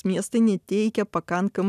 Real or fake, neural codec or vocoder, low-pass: real; none; 14.4 kHz